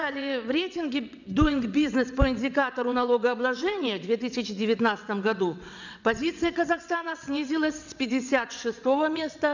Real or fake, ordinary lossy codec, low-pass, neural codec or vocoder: fake; none; 7.2 kHz; vocoder, 22.05 kHz, 80 mel bands, WaveNeXt